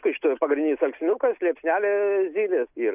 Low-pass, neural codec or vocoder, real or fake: 3.6 kHz; none; real